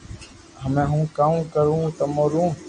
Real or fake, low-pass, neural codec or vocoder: real; 9.9 kHz; none